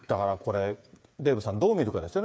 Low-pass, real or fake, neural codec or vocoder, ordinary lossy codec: none; fake; codec, 16 kHz, 8 kbps, FreqCodec, smaller model; none